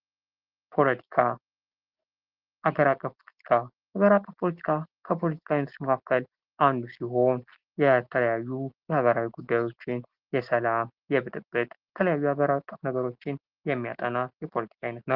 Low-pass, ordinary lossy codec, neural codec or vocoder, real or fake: 5.4 kHz; Opus, 16 kbps; none; real